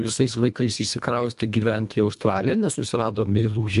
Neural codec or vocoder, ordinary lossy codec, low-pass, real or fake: codec, 24 kHz, 1.5 kbps, HILCodec; AAC, 96 kbps; 10.8 kHz; fake